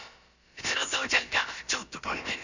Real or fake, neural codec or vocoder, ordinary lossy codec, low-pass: fake; codec, 16 kHz, about 1 kbps, DyCAST, with the encoder's durations; Opus, 64 kbps; 7.2 kHz